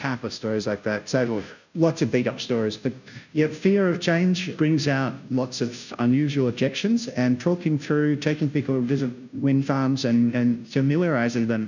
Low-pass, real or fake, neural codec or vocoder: 7.2 kHz; fake; codec, 16 kHz, 0.5 kbps, FunCodec, trained on Chinese and English, 25 frames a second